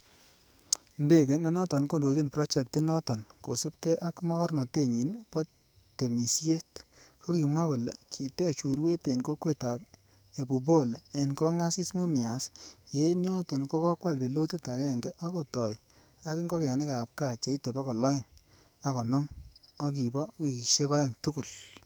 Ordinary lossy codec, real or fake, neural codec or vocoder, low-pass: none; fake; codec, 44.1 kHz, 2.6 kbps, SNAC; none